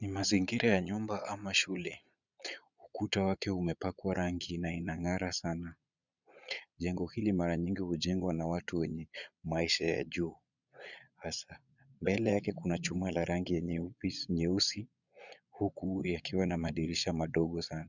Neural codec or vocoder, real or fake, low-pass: vocoder, 22.05 kHz, 80 mel bands, Vocos; fake; 7.2 kHz